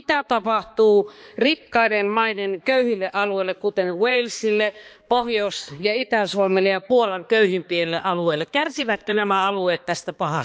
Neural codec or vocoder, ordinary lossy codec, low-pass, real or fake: codec, 16 kHz, 2 kbps, X-Codec, HuBERT features, trained on balanced general audio; none; none; fake